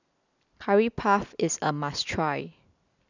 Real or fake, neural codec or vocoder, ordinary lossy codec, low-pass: real; none; none; 7.2 kHz